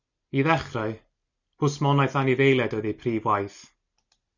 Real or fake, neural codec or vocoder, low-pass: real; none; 7.2 kHz